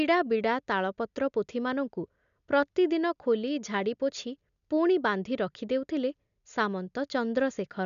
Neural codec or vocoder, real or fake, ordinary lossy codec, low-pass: none; real; none; 7.2 kHz